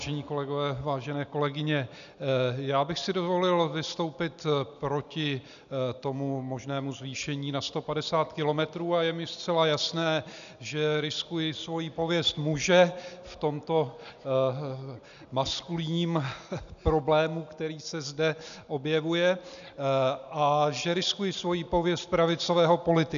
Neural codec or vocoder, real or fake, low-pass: none; real; 7.2 kHz